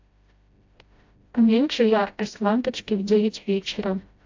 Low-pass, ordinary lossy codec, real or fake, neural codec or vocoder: 7.2 kHz; AAC, 48 kbps; fake; codec, 16 kHz, 0.5 kbps, FreqCodec, smaller model